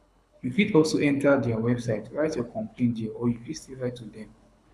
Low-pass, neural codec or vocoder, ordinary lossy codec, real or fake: none; codec, 24 kHz, 6 kbps, HILCodec; none; fake